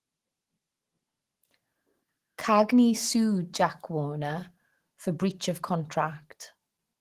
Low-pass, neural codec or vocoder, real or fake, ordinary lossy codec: 14.4 kHz; autoencoder, 48 kHz, 128 numbers a frame, DAC-VAE, trained on Japanese speech; fake; Opus, 16 kbps